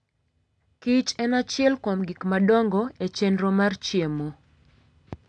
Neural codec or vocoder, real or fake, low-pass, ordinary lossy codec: none; real; 9.9 kHz; AAC, 64 kbps